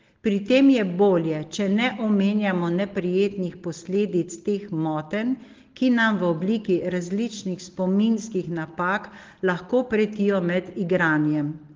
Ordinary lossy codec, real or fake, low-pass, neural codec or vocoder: Opus, 16 kbps; real; 7.2 kHz; none